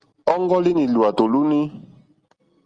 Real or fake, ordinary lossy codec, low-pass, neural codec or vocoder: real; Opus, 32 kbps; 9.9 kHz; none